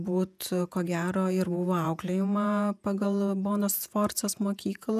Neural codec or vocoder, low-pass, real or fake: vocoder, 48 kHz, 128 mel bands, Vocos; 14.4 kHz; fake